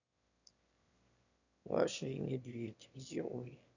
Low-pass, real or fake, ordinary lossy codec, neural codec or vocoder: 7.2 kHz; fake; none; autoencoder, 22.05 kHz, a latent of 192 numbers a frame, VITS, trained on one speaker